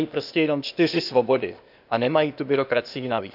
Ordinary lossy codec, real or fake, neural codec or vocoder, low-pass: none; fake; codec, 16 kHz, 0.8 kbps, ZipCodec; 5.4 kHz